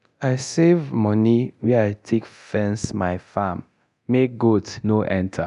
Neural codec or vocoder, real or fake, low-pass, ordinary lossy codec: codec, 24 kHz, 0.9 kbps, DualCodec; fake; 10.8 kHz; none